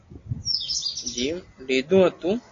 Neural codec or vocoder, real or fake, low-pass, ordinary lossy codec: none; real; 7.2 kHz; AAC, 32 kbps